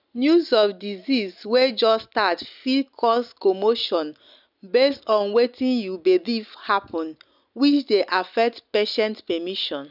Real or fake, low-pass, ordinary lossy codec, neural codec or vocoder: real; 5.4 kHz; none; none